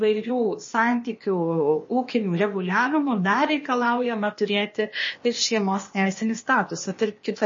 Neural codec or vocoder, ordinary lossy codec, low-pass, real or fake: codec, 16 kHz, 0.8 kbps, ZipCodec; MP3, 32 kbps; 7.2 kHz; fake